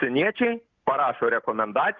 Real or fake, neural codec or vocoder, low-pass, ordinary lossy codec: real; none; 7.2 kHz; Opus, 24 kbps